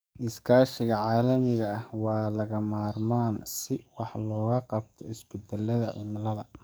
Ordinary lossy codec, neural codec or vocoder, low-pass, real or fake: none; codec, 44.1 kHz, 7.8 kbps, DAC; none; fake